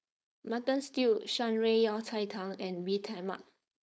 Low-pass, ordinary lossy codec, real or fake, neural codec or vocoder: none; none; fake; codec, 16 kHz, 4.8 kbps, FACodec